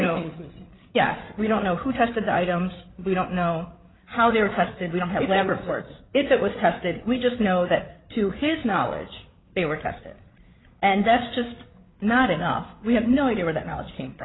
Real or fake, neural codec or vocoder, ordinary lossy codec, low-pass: fake; codec, 16 kHz, 8 kbps, FreqCodec, larger model; AAC, 16 kbps; 7.2 kHz